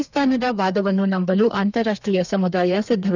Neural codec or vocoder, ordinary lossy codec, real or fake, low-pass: codec, 32 kHz, 1.9 kbps, SNAC; none; fake; 7.2 kHz